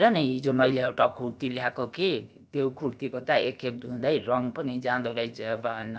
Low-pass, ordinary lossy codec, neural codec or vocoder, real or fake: none; none; codec, 16 kHz, about 1 kbps, DyCAST, with the encoder's durations; fake